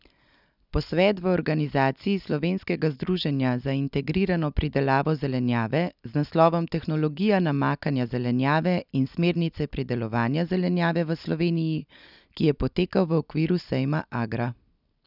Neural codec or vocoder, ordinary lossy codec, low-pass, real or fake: none; none; 5.4 kHz; real